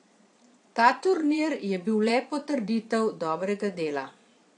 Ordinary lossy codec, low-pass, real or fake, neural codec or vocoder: AAC, 48 kbps; 9.9 kHz; fake; vocoder, 22.05 kHz, 80 mel bands, Vocos